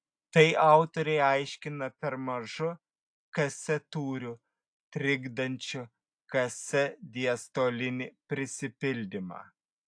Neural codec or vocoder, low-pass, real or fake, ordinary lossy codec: none; 9.9 kHz; real; AAC, 64 kbps